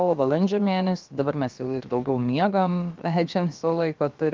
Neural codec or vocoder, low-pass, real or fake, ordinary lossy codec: codec, 16 kHz, about 1 kbps, DyCAST, with the encoder's durations; 7.2 kHz; fake; Opus, 24 kbps